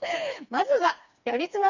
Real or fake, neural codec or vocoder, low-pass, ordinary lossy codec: fake; codec, 16 kHz, 2 kbps, FreqCodec, smaller model; 7.2 kHz; none